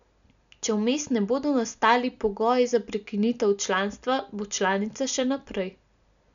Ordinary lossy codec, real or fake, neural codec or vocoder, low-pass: MP3, 96 kbps; real; none; 7.2 kHz